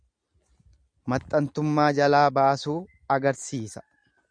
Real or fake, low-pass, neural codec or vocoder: real; 9.9 kHz; none